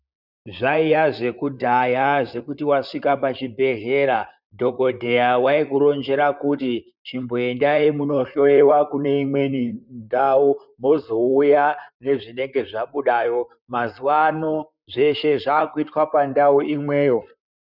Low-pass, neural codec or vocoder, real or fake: 5.4 kHz; vocoder, 44.1 kHz, 128 mel bands, Pupu-Vocoder; fake